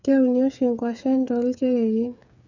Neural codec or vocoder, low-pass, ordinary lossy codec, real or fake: codec, 16 kHz, 8 kbps, FreqCodec, smaller model; 7.2 kHz; none; fake